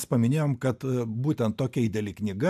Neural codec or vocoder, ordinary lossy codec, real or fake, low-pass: none; AAC, 96 kbps; real; 14.4 kHz